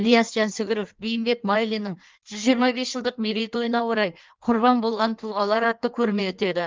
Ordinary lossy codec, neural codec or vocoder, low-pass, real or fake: Opus, 24 kbps; codec, 16 kHz in and 24 kHz out, 1.1 kbps, FireRedTTS-2 codec; 7.2 kHz; fake